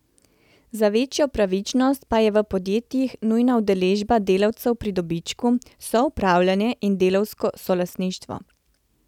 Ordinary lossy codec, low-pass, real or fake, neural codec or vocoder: none; 19.8 kHz; real; none